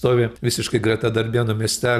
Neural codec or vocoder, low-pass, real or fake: none; 14.4 kHz; real